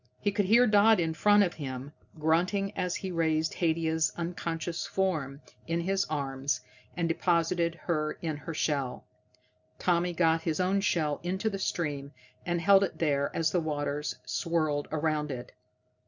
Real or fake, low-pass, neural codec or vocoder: real; 7.2 kHz; none